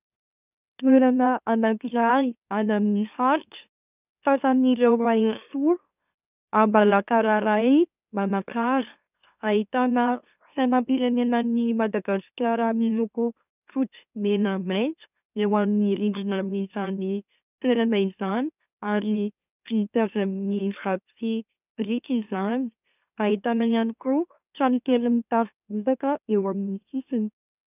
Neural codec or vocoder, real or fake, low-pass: autoencoder, 44.1 kHz, a latent of 192 numbers a frame, MeloTTS; fake; 3.6 kHz